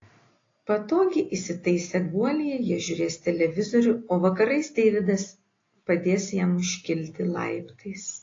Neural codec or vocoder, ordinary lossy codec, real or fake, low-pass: none; AAC, 32 kbps; real; 7.2 kHz